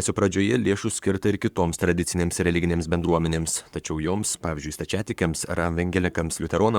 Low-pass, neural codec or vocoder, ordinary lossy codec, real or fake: 19.8 kHz; codec, 44.1 kHz, 7.8 kbps, Pupu-Codec; Opus, 64 kbps; fake